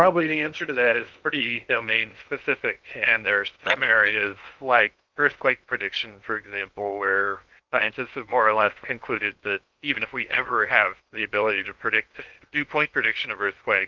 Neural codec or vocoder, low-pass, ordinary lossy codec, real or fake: codec, 16 kHz in and 24 kHz out, 0.8 kbps, FocalCodec, streaming, 65536 codes; 7.2 kHz; Opus, 24 kbps; fake